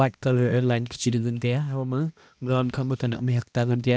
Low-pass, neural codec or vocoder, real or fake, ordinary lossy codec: none; codec, 16 kHz, 1 kbps, X-Codec, HuBERT features, trained on balanced general audio; fake; none